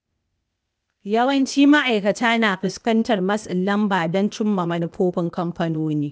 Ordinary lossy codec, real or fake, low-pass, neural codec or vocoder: none; fake; none; codec, 16 kHz, 0.8 kbps, ZipCodec